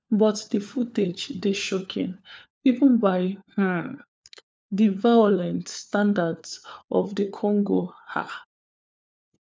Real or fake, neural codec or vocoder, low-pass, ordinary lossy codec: fake; codec, 16 kHz, 4 kbps, FunCodec, trained on LibriTTS, 50 frames a second; none; none